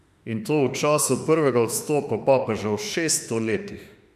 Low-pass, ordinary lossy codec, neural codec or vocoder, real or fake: 14.4 kHz; none; autoencoder, 48 kHz, 32 numbers a frame, DAC-VAE, trained on Japanese speech; fake